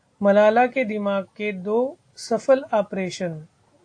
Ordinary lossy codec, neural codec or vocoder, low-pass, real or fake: AAC, 48 kbps; none; 9.9 kHz; real